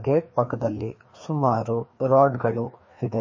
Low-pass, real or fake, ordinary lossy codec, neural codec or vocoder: 7.2 kHz; fake; MP3, 32 kbps; codec, 16 kHz, 2 kbps, FreqCodec, larger model